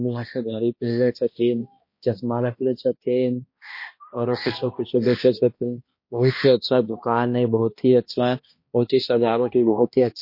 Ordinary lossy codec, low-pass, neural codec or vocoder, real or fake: MP3, 32 kbps; 5.4 kHz; codec, 16 kHz, 1 kbps, X-Codec, HuBERT features, trained on balanced general audio; fake